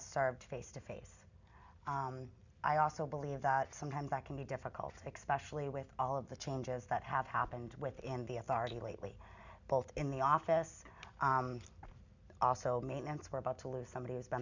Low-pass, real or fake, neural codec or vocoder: 7.2 kHz; real; none